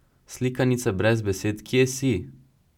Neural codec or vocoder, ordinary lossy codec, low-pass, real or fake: none; none; 19.8 kHz; real